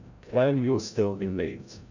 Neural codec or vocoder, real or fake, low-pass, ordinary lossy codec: codec, 16 kHz, 0.5 kbps, FreqCodec, larger model; fake; 7.2 kHz; none